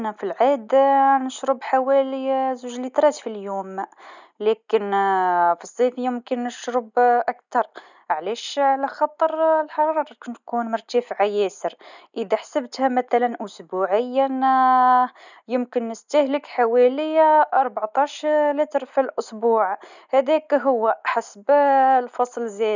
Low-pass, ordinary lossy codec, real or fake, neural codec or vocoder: 7.2 kHz; none; real; none